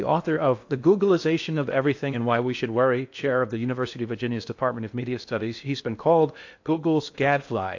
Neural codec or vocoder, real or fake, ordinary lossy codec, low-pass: codec, 16 kHz in and 24 kHz out, 0.8 kbps, FocalCodec, streaming, 65536 codes; fake; AAC, 48 kbps; 7.2 kHz